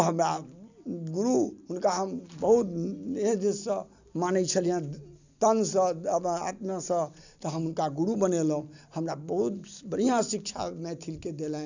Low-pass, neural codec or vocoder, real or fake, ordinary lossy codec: 7.2 kHz; none; real; none